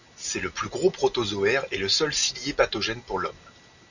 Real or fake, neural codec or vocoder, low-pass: real; none; 7.2 kHz